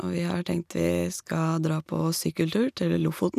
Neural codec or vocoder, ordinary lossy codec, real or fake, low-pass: none; none; real; 14.4 kHz